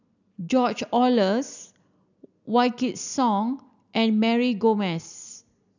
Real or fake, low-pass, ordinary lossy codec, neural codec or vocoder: real; 7.2 kHz; none; none